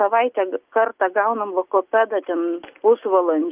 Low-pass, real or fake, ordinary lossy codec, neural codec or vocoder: 3.6 kHz; real; Opus, 24 kbps; none